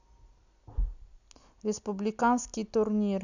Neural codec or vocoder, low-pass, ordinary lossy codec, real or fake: none; 7.2 kHz; none; real